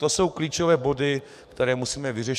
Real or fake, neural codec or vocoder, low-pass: fake; codec, 44.1 kHz, 7.8 kbps, DAC; 14.4 kHz